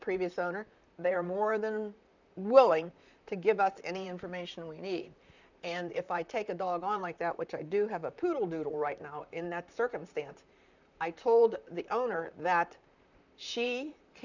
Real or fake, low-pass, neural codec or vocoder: fake; 7.2 kHz; vocoder, 44.1 kHz, 128 mel bands, Pupu-Vocoder